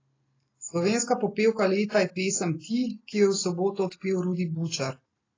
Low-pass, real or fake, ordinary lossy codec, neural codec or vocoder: 7.2 kHz; real; AAC, 32 kbps; none